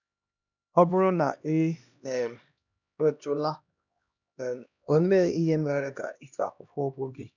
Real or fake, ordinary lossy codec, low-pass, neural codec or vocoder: fake; none; 7.2 kHz; codec, 16 kHz, 1 kbps, X-Codec, HuBERT features, trained on LibriSpeech